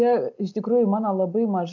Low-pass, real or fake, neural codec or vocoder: 7.2 kHz; real; none